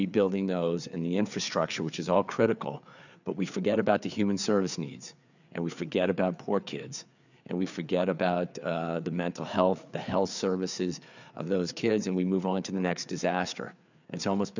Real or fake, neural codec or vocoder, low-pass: fake; codec, 16 kHz, 4 kbps, FreqCodec, larger model; 7.2 kHz